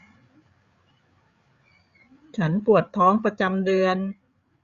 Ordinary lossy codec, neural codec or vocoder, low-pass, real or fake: Opus, 64 kbps; codec, 16 kHz, 8 kbps, FreqCodec, larger model; 7.2 kHz; fake